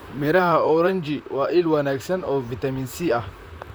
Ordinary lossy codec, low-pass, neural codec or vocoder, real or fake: none; none; vocoder, 44.1 kHz, 128 mel bands, Pupu-Vocoder; fake